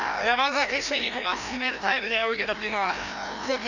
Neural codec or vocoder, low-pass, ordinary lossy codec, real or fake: codec, 16 kHz, 1 kbps, FreqCodec, larger model; 7.2 kHz; none; fake